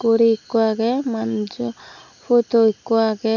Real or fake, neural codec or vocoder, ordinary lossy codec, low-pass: real; none; none; 7.2 kHz